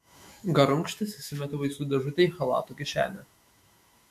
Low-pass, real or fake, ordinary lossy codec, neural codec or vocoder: 14.4 kHz; fake; MP3, 64 kbps; autoencoder, 48 kHz, 128 numbers a frame, DAC-VAE, trained on Japanese speech